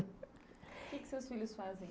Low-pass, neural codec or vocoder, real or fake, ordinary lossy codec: none; none; real; none